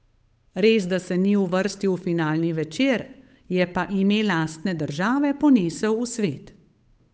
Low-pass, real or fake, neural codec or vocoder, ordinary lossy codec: none; fake; codec, 16 kHz, 8 kbps, FunCodec, trained on Chinese and English, 25 frames a second; none